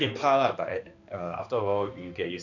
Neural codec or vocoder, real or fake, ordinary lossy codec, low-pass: codec, 16 kHz, 2 kbps, X-Codec, HuBERT features, trained on balanced general audio; fake; none; 7.2 kHz